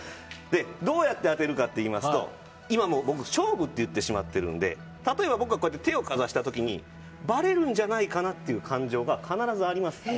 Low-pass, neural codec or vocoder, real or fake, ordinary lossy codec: none; none; real; none